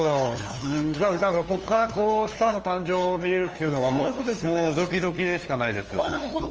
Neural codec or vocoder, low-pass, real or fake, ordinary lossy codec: codec, 16 kHz, 2 kbps, FunCodec, trained on LibriTTS, 25 frames a second; 7.2 kHz; fake; Opus, 24 kbps